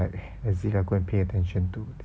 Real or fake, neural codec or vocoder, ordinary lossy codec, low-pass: real; none; none; none